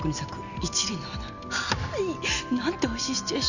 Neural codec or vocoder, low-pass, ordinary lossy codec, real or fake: none; 7.2 kHz; none; real